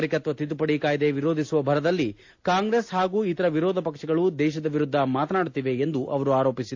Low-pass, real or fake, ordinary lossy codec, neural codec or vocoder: 7.2 kHz; real; AAC, 48 kbps; none